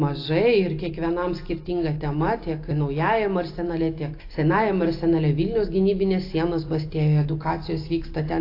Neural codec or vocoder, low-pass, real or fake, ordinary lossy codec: none; 5.4 kHz; real; AAC, 32 kbps